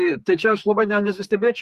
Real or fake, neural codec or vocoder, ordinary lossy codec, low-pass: fake; codec, 44.1 kHz, 2.6 kbps, SNAC; Opus, 64 kbps; 14.4 kHz